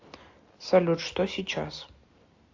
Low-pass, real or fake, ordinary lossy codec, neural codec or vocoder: 7.2 kHz; real; AAC, 48 kbps; none